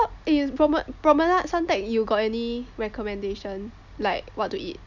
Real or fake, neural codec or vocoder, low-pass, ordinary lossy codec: real; none; 7.2 kHz; none